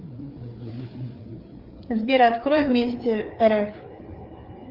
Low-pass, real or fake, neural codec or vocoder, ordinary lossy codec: 5.4 kHz; fake; codec, 16 kHz, 4 kbps, FreqCodec, larger model; Opus, 32 kbps